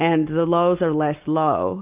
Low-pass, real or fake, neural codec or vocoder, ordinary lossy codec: 3.6 kHz; real; none; Opus, 24 kbps